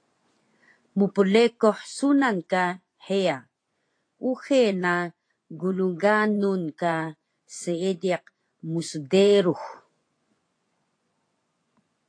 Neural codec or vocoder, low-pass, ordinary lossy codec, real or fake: vocoder, 24 kHz, 100 mel bands, Vocos; 9.9 kHz; AAC, 48 kbps; fake